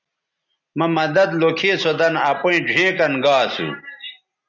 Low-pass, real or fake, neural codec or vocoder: 7.2 kHz; real; none